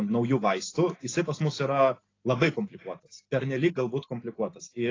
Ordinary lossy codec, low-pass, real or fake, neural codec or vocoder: AAC, 32 kbps; 7.2 kHz; real; none